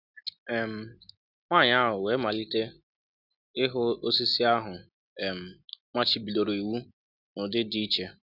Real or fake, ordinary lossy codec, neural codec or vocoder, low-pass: real; none; none; 5.4 kHz